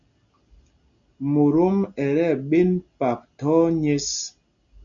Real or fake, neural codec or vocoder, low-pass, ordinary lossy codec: real; none; 7.2 kHz; MP3, 96 kbps